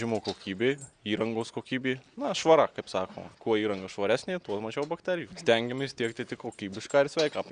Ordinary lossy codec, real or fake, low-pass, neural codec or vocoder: Opus, 64 kbps; real; 9.9 kHz; none